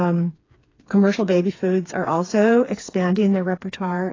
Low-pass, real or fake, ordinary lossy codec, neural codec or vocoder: 7.2 kHz; fake; AAC, 32 kbps; codec, 16 kHz, 4 kbps, FreqCodec, smaller model